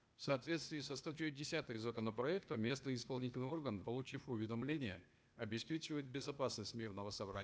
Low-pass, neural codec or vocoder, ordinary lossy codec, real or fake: none; codec, 16 kHz, 0.8 kbps, ZipCodec; none; fake